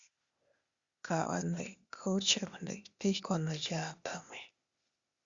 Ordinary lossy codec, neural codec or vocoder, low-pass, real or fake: Opus, 64 kbps; codec, 16 kHz, 0.8 kbps, ZipCodec; 7.2 kHz; fake